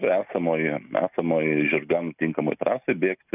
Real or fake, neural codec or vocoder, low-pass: real; none; 3.6 kHz